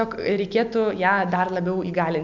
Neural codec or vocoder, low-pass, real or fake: none; 7.2 kHz; real